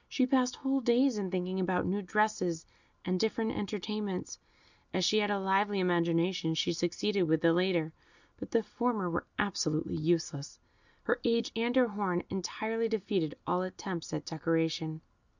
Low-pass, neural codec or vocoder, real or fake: 7.2 kHz; none; real